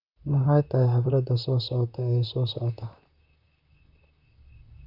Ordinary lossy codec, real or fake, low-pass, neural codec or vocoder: none; fake; 5.4 kHz; vocoder, 44.1 kHz, 128 mel bands, Pupu-Vocoder